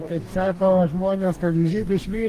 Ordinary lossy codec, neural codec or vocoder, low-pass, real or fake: Opus, 24 kbps; codec, 44.1 kHz, 2.6 kbps, DAC; 14.4 kHz; fake